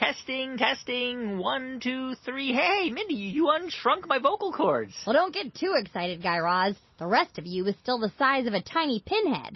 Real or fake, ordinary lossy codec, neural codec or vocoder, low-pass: real; MP3, 24 kbps; none; 7.2 kHz